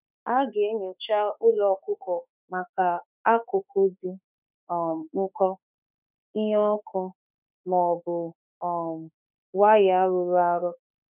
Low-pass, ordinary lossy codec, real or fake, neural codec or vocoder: 3.6 kHz; none; fake; autoencoder, 48 kHz, 32 numbers a frame, DAC-VAE, trained on Japanese speech